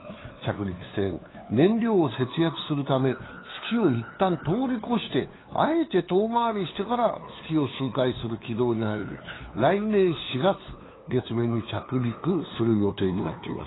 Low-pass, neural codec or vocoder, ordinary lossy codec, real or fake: 7.2 kHz; codec, 16 kHz, 2 kbps, FunCodec, trained on LibriTTS, 25 frames a second; AAC, 16 kbps; fake